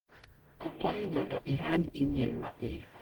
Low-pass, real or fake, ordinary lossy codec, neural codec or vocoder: 19.8 kHz; fake; Opus, 24 kbps; codec, 44.1 kHz, 0.9 kbps, DAC